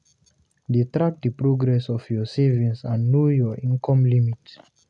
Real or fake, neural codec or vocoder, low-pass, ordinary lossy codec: real; none; 10.8 kHz; none